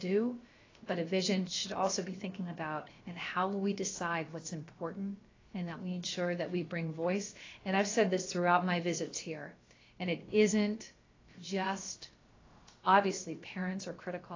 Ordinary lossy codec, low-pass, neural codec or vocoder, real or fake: AAC, 32 kbps; 7.2 kHz; codec, 16 kHz, about 1 kbps, DyCAST, with the encoder's durations; fake